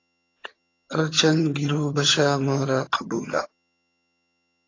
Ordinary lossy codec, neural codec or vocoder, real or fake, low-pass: AAC, 32 kbps; vocoder, 22.05 kHz, 80 mel bands, HiFi-GAN; fake; 7.2 kHz